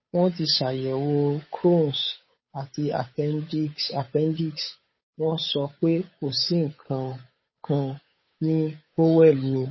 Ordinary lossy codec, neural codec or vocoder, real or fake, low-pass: MP3, 24 kbps; codec, 16 kHz, 8 kbps, FunCodec, trained on Chinese and English, 25 frames a second; fake; 7.2 kHz